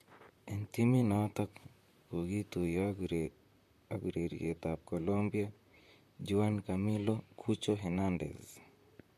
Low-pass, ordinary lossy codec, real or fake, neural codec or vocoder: 14.4 kHz; MP3, 64 kbps; fake; vocoder, 44.1 kHz, 128 mel bands, Pupu-Vocoder